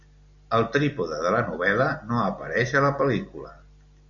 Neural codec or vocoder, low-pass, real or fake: none; 7.2 kHz; real